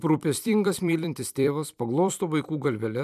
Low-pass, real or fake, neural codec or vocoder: 14.4 kHz; fake; vocoder, 44.1 kHz, 128 mel bands every 256 samples, BigVGAN v2